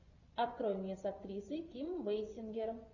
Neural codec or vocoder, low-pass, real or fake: vocoder, 24 kHz, 100 mel bands, Vocos; 7.2 kHz; fake